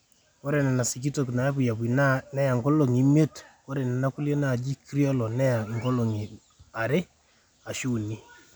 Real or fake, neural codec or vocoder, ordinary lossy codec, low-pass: real; none; none; none